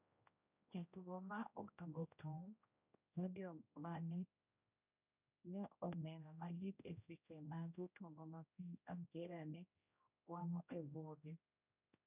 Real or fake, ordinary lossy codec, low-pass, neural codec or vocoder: fake; none; 3.6 kHz; codec, 16 kHz, 0.5 kbps, X-Codec, HuBERT features, trained on general audio